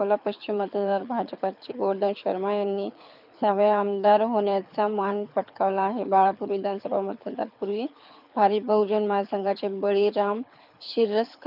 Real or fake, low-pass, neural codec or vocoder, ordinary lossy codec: fake; 5.4 kHz; codec, 24 kHz, 6 kbps, HILCodec; none